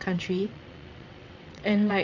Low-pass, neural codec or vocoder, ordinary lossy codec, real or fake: 7.2 kHz; vocoder, 22.05 kHz, 80 mel bands, WaveNeXt; none; fake